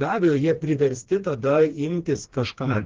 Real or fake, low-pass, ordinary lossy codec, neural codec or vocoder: fake; 7.2 kHz; Opus, 16 kbps; codec, 16 kHz, 2 kbps, FreqCodec, smaller model